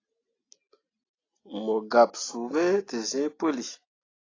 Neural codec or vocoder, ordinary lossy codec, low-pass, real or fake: none; AAC, 32 kbps; 7.2 kHz; real